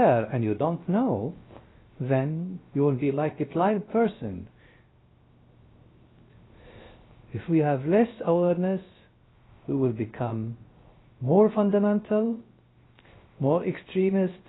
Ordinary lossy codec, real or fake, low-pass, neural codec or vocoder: AAC, 16 kbps; fake; 7.2 kHz; codec, 16 kHz, 0.3 kbps, FocalCodec